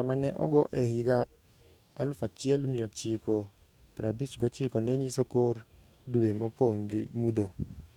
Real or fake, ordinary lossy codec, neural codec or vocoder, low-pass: fake; none; codec, 44.1 kHz, 2.6 kbps, DAC; 19.8 kHz